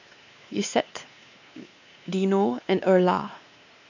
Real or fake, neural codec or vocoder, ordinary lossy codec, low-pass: fake; codec, 16 kHz, 2 kbps, X-Codec, WavLM features, trained on Multilingual LibriSpeech; none; 7.2 kHz